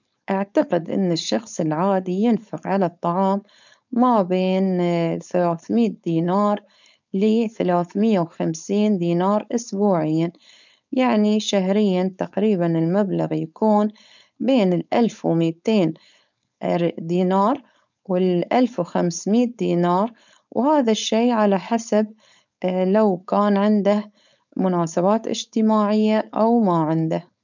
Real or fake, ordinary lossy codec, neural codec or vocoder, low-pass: fake; none; codec, 16 kHz, 4.8 kbps, FACodec; 7.2 kHz